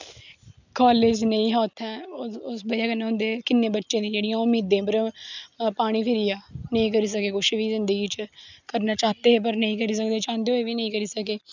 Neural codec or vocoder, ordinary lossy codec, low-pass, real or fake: none; none; 7.2 kHz; real